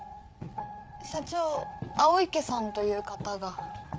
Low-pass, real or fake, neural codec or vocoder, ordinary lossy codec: none; fake; codec, 16 kHz, 8 kbps, FreqCodec, larger model; none